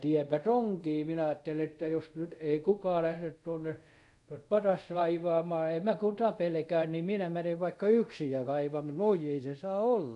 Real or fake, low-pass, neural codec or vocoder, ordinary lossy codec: fake; 10.8 kHz; codec, 24 kHz, 0.5 kbps, DualCodec; none